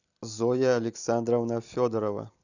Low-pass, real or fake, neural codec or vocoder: 7.2 kHz; real; none